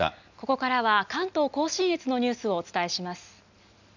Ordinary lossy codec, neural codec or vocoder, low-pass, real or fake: none; none; 7.2 kHz; real